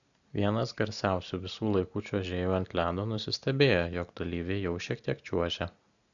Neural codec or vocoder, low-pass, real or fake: none; 7.2 kHz; real